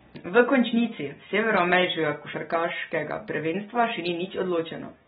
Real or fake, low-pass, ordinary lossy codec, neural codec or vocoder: real; 19.8 kHz; AAC, 16 kbps; none